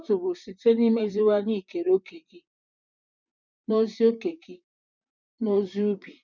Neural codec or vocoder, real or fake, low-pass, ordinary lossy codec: vocoder, 44.1 kHz, 128 mel bands, Pupu-Vocoder; fake; 7.2 kHz; none